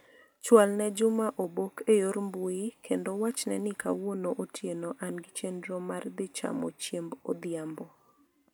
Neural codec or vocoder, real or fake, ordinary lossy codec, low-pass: none; real; none; none